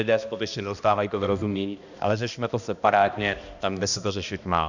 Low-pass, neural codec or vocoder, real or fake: 7.2 kHz; codec, 16 kHz, 1 kbps, X-Codec, HuBERT features, trained on balanced general audio; fake